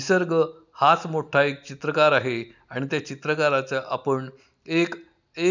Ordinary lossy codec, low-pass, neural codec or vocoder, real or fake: none; 7.2 kHz; none; real